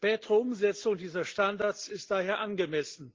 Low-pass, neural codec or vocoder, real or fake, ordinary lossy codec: 7.2 kHz; none; real; Opus, 16 kbps